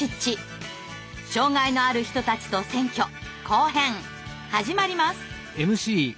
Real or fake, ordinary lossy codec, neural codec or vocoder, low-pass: real; none; none; none